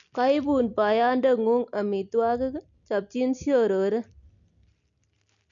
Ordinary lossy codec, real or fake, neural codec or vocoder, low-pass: none; real; none; 7.2 kHz